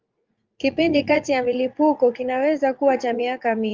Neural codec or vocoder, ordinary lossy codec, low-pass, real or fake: vocoder, 44.1 kHz, 80 mel bands, Vocos; Opus, 24 kbps; 7.2 kHz; fake